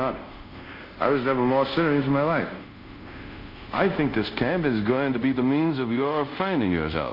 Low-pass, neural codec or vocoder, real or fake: 5.4 kHz; codec, 24 kHz, 0.5 kbps, DualCodec; fake